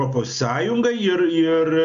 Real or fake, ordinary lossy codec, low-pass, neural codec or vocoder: real; AAC, 96 kbps; 7.2 kHz; none